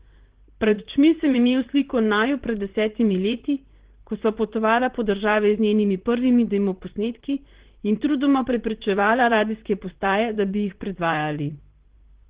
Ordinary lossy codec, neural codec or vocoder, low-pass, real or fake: Opus, 16 kbps; vocoder, 44.1 kHz, 128 mel bands, Pupu-Vocoder; 3.6 kHz; fake